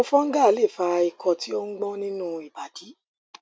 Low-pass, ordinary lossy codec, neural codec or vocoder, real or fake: none; none; none; real